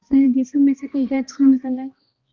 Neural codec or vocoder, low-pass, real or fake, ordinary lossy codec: codec, 16 kHz, 1 kbps, X-Codec, HuBERT features, trained on balanced general audio; 7.2 kHz; fake; Opus, 32 kbps